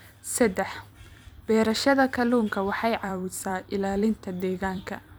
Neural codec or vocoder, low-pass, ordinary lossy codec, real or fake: vocoder, 44.1 kHz, 128 mel bands every 256 samples, BigVGAN v2; none; none; fake